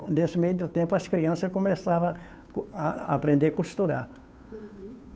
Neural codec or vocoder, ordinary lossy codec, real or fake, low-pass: codec, 16 kHz, 2 kbps, FunCodec, trained on Chinese and English, 25 frames a second; none; fake; none